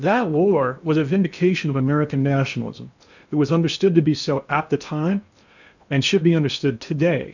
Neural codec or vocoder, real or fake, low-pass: codec, 16 kHz in and 24 kHz out, 0.8 kbps, FocalCodec, streaming, 65536 codes; fake; 7.2 kHz